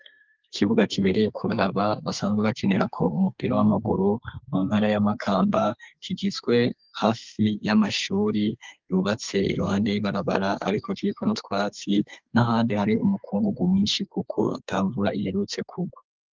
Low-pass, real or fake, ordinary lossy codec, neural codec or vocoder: 7.2 kHz; fake; Opus, 24 kbps; codec, 32 kHz, 1.9 kbps, SNAC